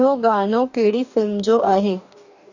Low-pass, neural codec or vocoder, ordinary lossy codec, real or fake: 7.2 kHz; codec, 44.1 kHz, 2.6 kbps, DAC; none; fake